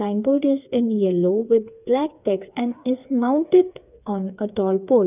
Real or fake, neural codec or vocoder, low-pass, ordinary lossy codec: fake; codec, 16 kHz, 4 kbps, FreqCodec, smaller model; 3.6 kHz; none